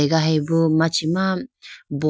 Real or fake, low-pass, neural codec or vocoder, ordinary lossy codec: real; none; none; none